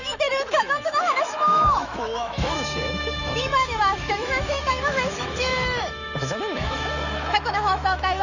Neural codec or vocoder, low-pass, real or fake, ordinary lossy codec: autoencoder, 48 kHz, 128 numbers a frame, DAC-VAE, trained on Japanese speech; 7.2 kHz; fake; none